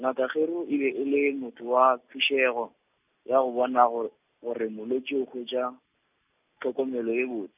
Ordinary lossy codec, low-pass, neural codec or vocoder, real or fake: none; 3.6 kHz; none; real